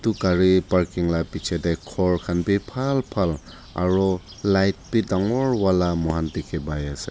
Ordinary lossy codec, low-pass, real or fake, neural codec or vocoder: none; none; real; none